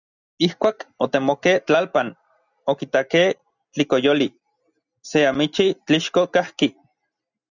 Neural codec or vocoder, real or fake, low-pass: none; real; 7.2 kHz